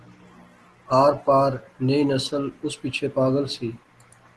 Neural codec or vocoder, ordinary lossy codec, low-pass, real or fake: none; Opus, 16 kbps; 10.8 kHz; real